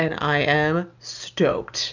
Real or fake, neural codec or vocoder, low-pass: real; none; 7.2 kHz